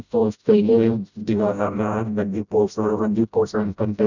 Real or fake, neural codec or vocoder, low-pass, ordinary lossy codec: fake; codec, 16 kHz, 0.5 kbps, FreqCodec, smaller model; 7.2 kHz; none